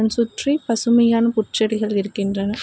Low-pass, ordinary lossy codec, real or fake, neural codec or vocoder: none; none; real; none